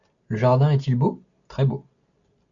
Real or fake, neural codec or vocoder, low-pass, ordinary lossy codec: real; none; 7.2 kHz; MP3, 64 kbps